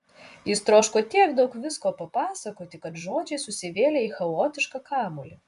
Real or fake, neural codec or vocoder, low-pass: fake; vocoder, 24 kHz, 100 mel bands, Vocos; 10.8 kHz